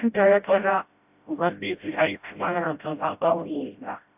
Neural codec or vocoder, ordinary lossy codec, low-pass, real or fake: codec, 16 kHz, 0.5 kbps, FreqCodec, smaller model; AAC, 32 kbps; 3.6 kHz; fake